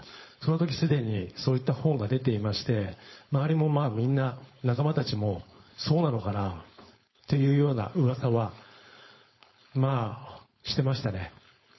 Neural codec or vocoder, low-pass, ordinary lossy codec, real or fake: codec, 16 kHz, 4.8 kbps, FACodec; 7.2 kHz; MP3, 24 kbps; fake